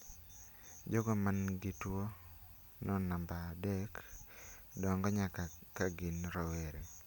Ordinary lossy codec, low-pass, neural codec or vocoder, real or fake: none; none; none; real